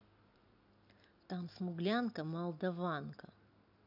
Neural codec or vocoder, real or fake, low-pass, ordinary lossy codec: none; real; 5.4 kHz; none